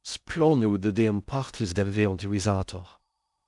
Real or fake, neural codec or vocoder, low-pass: fake; codec, 16 kHz in and 24 kHz out, 0.6 kbps, FocalCodec, streaming, 2048 codes; 10.8 kHz